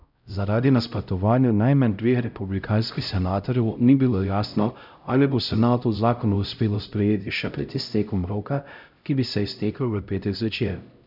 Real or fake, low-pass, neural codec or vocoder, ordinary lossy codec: fake; 5.4 kHz; codec, 16 kHz, 0.5 kbps, X-Codec, HuBERT features, trained on LibriSpeech; none